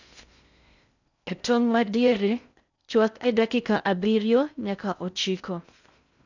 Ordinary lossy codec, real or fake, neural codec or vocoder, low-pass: none; fake; codec, 16 kHz in and 24 kHz out, 0.6 kbps, FocalCodec, streaming, 2048 codes; 7.2 kHz